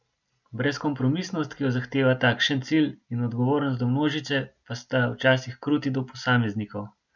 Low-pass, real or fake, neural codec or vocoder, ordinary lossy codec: 7.2 kHz; real; none; none